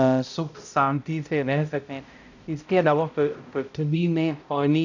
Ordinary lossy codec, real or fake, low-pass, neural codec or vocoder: none; fake; 7.2 kHz; codec, 16 kHz, 0.5 kbps, X-Codec, HuBERT features, trained on balanced general audio